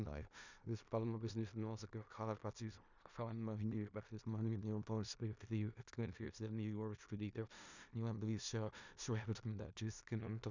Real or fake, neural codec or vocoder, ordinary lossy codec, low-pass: fake; codec, 16 kHz in and 24 kHz out, 0.4 kbps, LongCat-Audio-Codec, four codebook decoder; none; 7.2 kHz